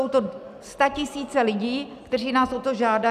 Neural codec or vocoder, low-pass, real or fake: none; 14.4 kHz; real